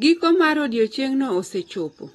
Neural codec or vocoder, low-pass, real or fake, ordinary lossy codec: none; 19.8 kHz; real; AAC, 32 kbps